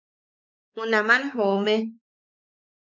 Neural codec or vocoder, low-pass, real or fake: codec, 16 kHz, 4 kbps, X-Codec, WavLM features, trained on Multilingual LibriSpeech; 7.2 kHz; fake